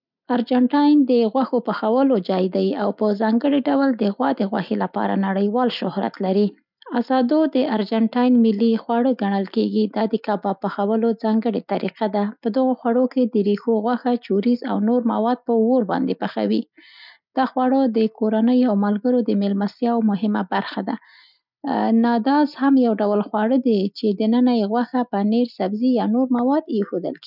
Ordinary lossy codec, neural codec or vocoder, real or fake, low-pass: none; none; real; 5.4 kHz